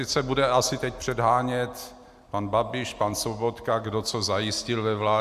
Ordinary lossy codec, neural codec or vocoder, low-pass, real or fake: Opus, 64 kbps; none; 14.4 kHz; real